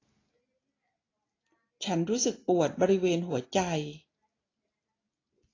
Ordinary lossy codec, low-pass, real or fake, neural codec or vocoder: AAC, 32 kbps; 7.2 kHz; real; none